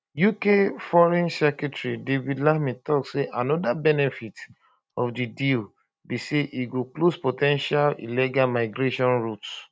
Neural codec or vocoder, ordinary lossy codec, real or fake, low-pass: none; none; real; none